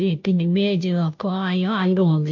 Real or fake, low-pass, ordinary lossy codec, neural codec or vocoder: fake; 7.2 kHz; none; codec, 16 kHz, 0.5 kbps, FunCodec, trained on Chinese and English, 25 frames a second